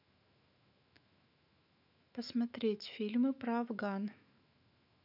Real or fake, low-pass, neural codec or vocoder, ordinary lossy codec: fake; 5.4 kHz; autoencoder, 48 kHz, 128 numbers a frame, DAC-VAE, trained on Japanese speech; none